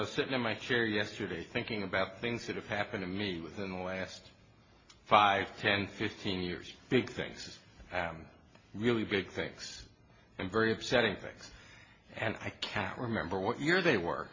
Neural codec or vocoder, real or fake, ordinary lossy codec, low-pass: none; real; MP3, 32 kbps; 7.2 kHz